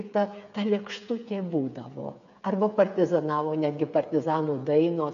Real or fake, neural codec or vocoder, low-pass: fake; codec, 16 kHz, 8 kbps, FreqCodec, smaller model; 7.2 kHz